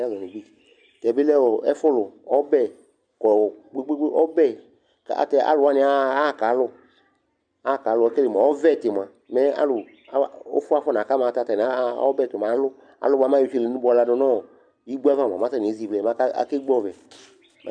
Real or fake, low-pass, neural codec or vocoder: real; 9.9 kHz; none